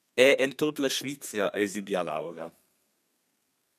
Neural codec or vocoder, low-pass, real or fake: codec, 32 kHz, 1.9 kbps, SNAC; 14.4 kHz; fake